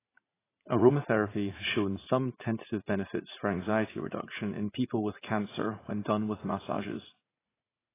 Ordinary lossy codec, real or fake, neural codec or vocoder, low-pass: AAC, 16 kbps; fake; vocoder, 44.1 kHz, 80 mel bands, Vocos; 3.6 kHz